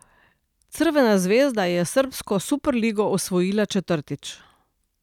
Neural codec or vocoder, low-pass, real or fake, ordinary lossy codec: none; 19.8 kHz; real; none